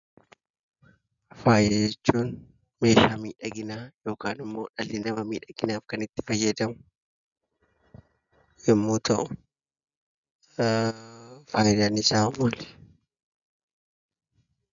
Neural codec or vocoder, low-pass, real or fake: none; 7.2 kHz; real